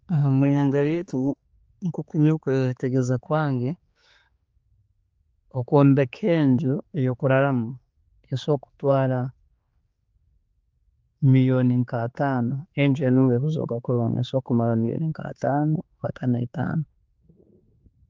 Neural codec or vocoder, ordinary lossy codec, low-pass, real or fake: codec, 16 kHz, 4 kbps, X-Codec, HuBERT features, trained on general audio; Opus, 24 kbps; 7.2 kHz; fake